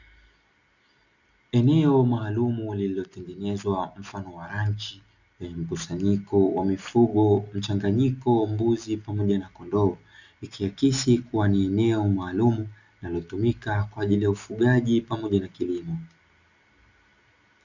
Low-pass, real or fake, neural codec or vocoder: 7.2 kHz; real; none